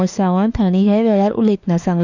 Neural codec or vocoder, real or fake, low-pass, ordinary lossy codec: autoencoder, 48 kHz, 32 numbers a frame, DAC-VAE, trained on Japanese speech; fake; 7.2 kHz; none